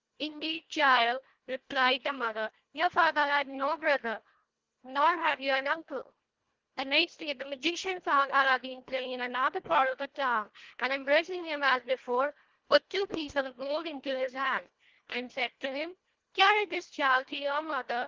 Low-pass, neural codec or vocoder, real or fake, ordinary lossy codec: 7.2 kHz; codec, 24 kHz, 1.5 kbps, HILCodec; fake; Opus, 16 kbps